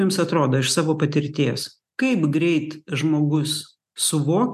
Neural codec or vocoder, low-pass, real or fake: none; 14.4 kHz; real